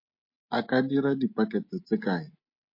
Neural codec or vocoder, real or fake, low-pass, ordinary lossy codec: none; real; 5.4 kHz; MP3, 32 kbps